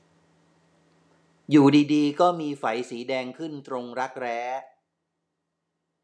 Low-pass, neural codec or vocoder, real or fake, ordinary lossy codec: none; none; real; none